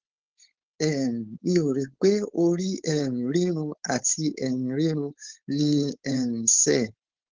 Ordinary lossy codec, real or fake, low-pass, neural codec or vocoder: Opus, 16 kbps; fake; 7.2 kHz; codec, 16 kHz, 4.8 kbps, FACodec